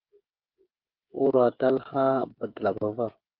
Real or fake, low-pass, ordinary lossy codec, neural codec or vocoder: fake; 5.4 kHz; Opus, 24 kbps; codec, 16 kHz, 8 kbps, FreqCodec, smaller model